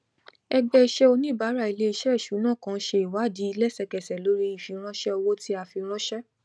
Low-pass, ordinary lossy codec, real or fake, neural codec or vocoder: none; none; real; none